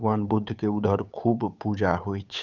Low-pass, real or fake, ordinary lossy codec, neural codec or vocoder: 7.2 kHz; fake; none; codec, 16 kHz, 8 kbps, FunCodec, trained on Chinese and English, 25 frames a second